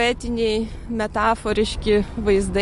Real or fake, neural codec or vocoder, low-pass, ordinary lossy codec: real; none; 14.4 kHz; MP3, 48 kbps